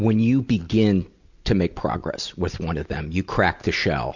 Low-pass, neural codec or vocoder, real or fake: 7.2 kHz; none; real